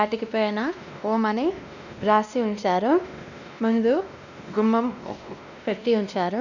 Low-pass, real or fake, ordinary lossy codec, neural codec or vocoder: 7.2 kHz; fake; none; codec, 16 kHz, 1 kbps, X-Codec, WavLM features, trained on Multilingual LibriSpeech